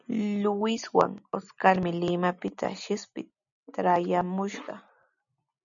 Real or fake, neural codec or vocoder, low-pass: real; none; 7.2 kHz